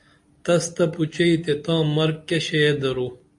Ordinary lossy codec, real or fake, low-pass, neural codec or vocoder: AAC, 64 kbps; real; 10.8 kHz; none